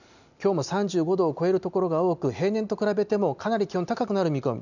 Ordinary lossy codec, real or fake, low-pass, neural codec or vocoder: none; real; 7.2 kHz; none